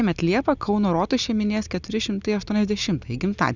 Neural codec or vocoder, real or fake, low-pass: none; real; 7.2 kHz